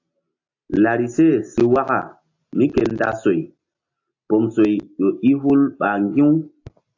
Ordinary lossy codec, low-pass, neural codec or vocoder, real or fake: AAC, 48 kbps; 7.2 kHz; none; real